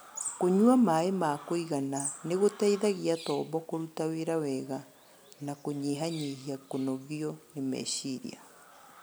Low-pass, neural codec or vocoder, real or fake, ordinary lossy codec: none; none; real; none